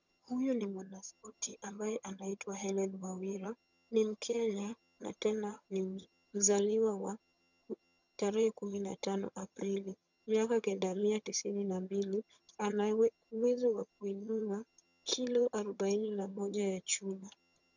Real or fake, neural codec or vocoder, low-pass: fake; vocoder, 22.05 kHz, 80 mel bands, HiFi-GAN; 7.2 kHz